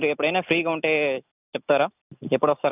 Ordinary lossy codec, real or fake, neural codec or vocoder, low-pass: none; real; none; 3.6 kHz